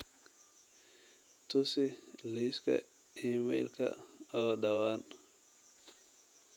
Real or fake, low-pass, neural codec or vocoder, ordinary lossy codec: fake; 19.8 kHz; vocoder, 48 kHz, 128 mel bands, Vocos; none